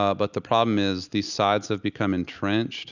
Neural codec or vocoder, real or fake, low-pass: none; real; 7.2 kHz